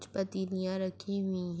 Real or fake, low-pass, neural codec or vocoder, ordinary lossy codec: real; none; none; none